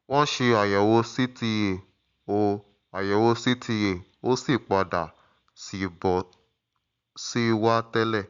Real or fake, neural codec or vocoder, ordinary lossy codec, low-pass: real; none; Opus, 64 kbps; 7.2 kHz